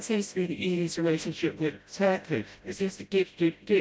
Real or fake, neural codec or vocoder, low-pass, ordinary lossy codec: fake; codec, 16 kHz, 0.5 kbps, FreqCodec, smaller model; none; none